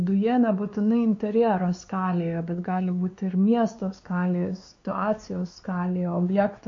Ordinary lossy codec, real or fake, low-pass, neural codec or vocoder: MP3, 48 kbps; fake; 7.2 kHz; codec, 16 kHz, 2 kbps, X-Codec, WavLM features, trained on Multilingual LibriSpeech